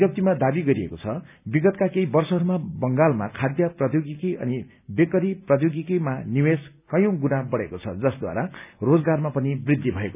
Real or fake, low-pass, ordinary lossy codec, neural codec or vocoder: real; 3.6 kHz; none; none